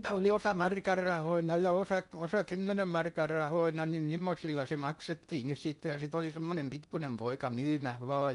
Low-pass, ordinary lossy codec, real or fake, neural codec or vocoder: 10.8 kHz; none; fake; codec, 16 kHz in and 24 kHz out, 0.8 kbps, FocalCodec, streaming, 65536 codes